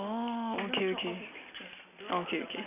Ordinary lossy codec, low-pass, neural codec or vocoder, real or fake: none; 3.6 kHz; none; real